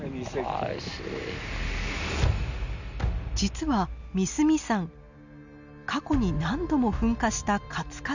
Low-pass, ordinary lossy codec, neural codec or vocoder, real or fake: 7.2 kHz; none; none; real